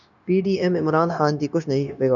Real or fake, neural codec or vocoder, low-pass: fake; codec, 16 kHz, 0.9 kbps, LongCat-Audio-Codec; 7.2 kHz